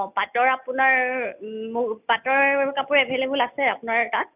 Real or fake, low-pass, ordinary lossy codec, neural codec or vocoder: real; 3.6 kHz; none; none